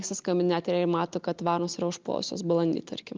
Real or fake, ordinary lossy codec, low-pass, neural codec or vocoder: real; Opus, 32 kbps; 7.2 kHz; none